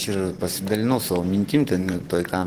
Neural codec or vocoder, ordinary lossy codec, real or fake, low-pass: vocoder, 44.1 kHz, 128 mel bands every 512 samples, BigVGAN v2; Opus, 16 kbps; fake; 14.4 kHz